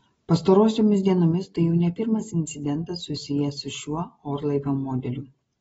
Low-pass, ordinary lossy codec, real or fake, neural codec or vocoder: 19.8 kHz; AAC, 24 kbps; real; none